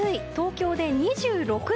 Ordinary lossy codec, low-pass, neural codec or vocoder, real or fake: none; none; none; real